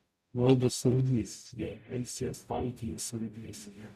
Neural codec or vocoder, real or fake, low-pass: codec, 44.1 kHz, 0.9 kbps, DAC; fake; 14.4 kHz